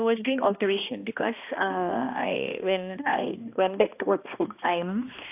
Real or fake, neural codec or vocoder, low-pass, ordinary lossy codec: fake; codec, 16 kHz, 1 kbps, X-Codec, HuBERT features, trained on balanced general audio; 3.6 kHz; AAC, 32 kbps